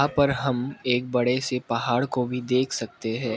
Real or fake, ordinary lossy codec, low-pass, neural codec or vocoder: real; none; none; none